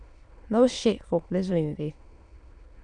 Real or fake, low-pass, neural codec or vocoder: fake; 9.9 kHz; autoencoder, 22.05 kHz, a latent of 192 numbers a frame, VITS, trained on many speakers